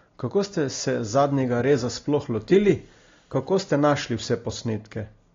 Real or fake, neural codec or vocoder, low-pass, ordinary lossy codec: real; none; 7.2 kHz; AAC, 32 kbps